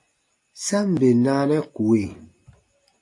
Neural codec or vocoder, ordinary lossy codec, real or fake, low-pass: none; AAC, 48 kbps; real; 10.8 kHz